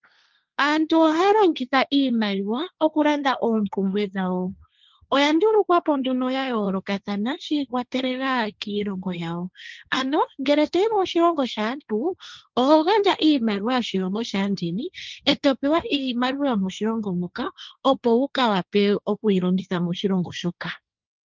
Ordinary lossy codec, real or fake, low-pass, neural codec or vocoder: Opus, 24 kbps; fake; 7.2 kHz; codec, 16 kHz, 1.1 kbps, Voila-Tokenizer